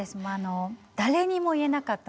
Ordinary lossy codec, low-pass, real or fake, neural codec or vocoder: none; none; real; none